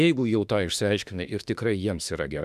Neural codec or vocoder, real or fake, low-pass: autoencoder, 48 kHz, 32 numbers a frame, DAC-VAE, trained on Japanese speech; fake; 14.4 kHz